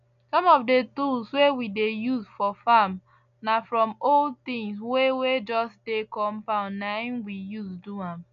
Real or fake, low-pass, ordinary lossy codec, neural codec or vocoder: real; 7.2 kHz; none; none